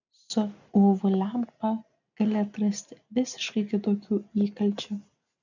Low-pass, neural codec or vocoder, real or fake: 7.2 kHz; none; real